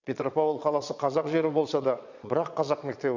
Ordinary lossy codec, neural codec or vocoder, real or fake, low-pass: none; vocoder, 22.05 kHz, 80 mel bands, Vocos; fake; 7.2 kHz